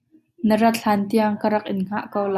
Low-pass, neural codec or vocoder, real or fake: 14.4 kHz; none; real